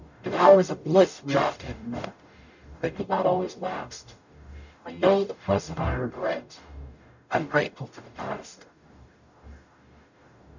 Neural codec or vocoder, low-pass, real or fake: codec, 44.1 kHz, 0.9 kbps, DAC; 7.2 kHz; fake